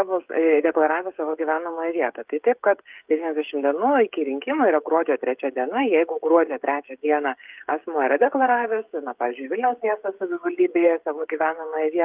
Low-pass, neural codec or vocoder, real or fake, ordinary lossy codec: 3.6 kHz; codec, 16 kHz, 16 kbps, FreqCodec, smaller model; fake; Opus, 32 kbps